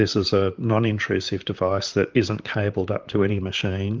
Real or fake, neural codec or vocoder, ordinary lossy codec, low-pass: fake; vocoder, 44.1 kHz, 128 mel bands, Pupu-Vocoder; Opus, 32 kbps; 7.2 kHz